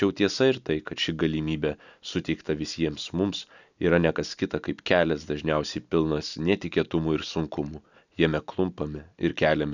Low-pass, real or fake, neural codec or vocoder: 7.2 kHz; real; none